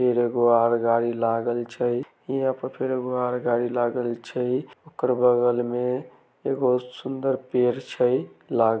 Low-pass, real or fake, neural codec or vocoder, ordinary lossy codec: none; real; none; none